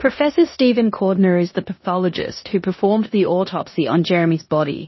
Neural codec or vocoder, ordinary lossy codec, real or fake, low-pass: codec, 16 kHz in and 24 kHz out, 0.9 kbps, LongCat-Audio-Codec, four codebook decoder; MP3, 24 kbps; fake; 7.2 kHz